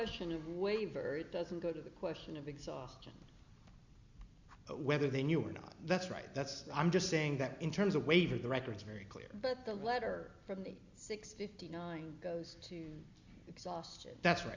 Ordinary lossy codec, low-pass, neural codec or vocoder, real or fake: Opus, 64 kbps; 7.2 kHz; none; real